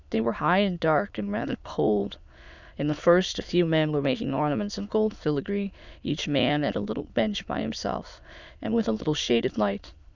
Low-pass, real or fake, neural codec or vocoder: 7.2 kHz; fake; autoencoder, 22.05 kHz, a latent of 192 numbers a frame, VITS, trained on many speakers